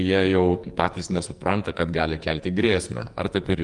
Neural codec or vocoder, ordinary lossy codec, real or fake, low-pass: codec, 44.1 kHz, 2.6 kbps, SNAC; Opus, 32 kbps; fake; 10.8 kHz